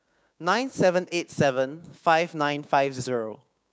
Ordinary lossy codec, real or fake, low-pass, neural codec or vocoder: none; fake; none; codec, 16 kHz, 6 kbps, DAC